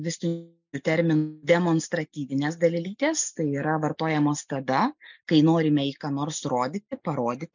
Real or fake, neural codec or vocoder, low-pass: real; none; 7.2 kHz